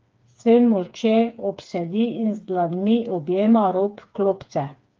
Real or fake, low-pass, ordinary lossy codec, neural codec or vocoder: fake; 7.2 kHz; Opus, 32 kbps; codec, 16 kHz, 4 kbps, FreqCodec, smaller model